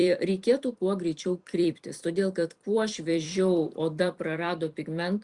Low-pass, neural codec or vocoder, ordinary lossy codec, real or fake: 10.8 kHz; vocoder, 44.1 kHz, 128 mel bands every 256 samples, BigVGAN v2; Opus, 64 kbps; fake